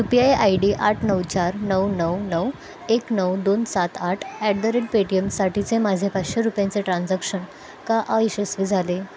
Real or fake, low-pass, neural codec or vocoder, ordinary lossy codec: real; none; none; none